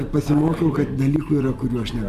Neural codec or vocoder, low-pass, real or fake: none; 14.4 kHz; real